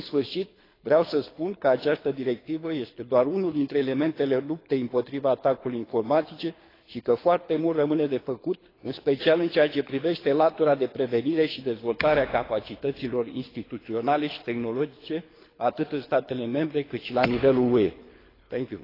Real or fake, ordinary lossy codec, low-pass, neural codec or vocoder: fake; AAC, 24 kbps; 5.4 kHz; codec, 24 kHz, 6 kbps, HILCodec